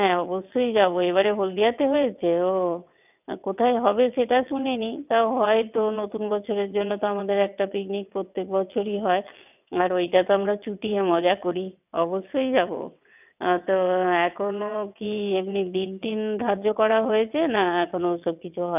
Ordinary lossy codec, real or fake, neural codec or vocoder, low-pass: none; fake; vocoder, 22.05 kHz, 80 mel bands, WaveNeXt; 3.6 kHz